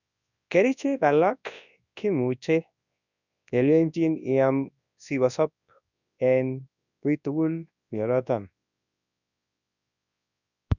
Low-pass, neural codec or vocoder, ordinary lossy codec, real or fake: 7.2 kHz; codec, 24 kHz, 0.9 kbps, WavTokenizer, large speech release; none; fake